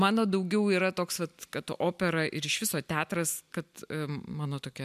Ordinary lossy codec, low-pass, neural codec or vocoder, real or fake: MP3, 96 kbps; 14.4 kHz; none; real